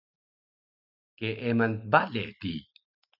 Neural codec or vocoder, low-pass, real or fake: none; 5.4 kHz; real